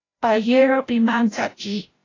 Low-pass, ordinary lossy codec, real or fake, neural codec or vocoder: 7.2 kHz; AAC, 32 kbps; fake; codec, 16 kHz, 0.5 kbps, FreqCodec, larger model